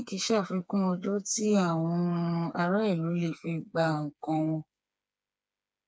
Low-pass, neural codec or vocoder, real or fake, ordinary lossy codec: none; codec, 16 kHz, 4 kbps, FreqCodec, smaller model; fake; none